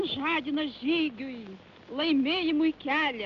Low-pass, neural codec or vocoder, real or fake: 7.2 kHz; none; real